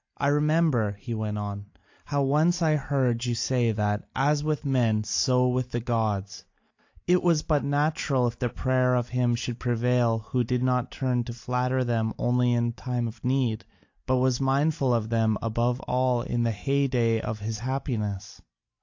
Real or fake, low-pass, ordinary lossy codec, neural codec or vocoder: real; 7.2 kHz; AAC, 48 kbps; none